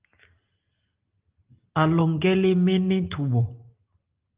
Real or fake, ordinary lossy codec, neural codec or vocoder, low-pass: real; Opus, 32 kbps; none; 3.6 kHz